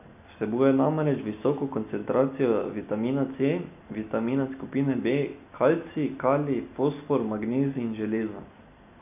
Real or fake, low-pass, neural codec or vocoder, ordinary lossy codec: real; 3.6 kHz; none; none